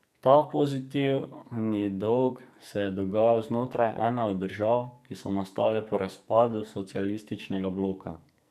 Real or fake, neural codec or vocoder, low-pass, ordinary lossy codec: fake; codec, 44.1 kHz, 2.6 kbps, SNAC; 14.4 kHz; none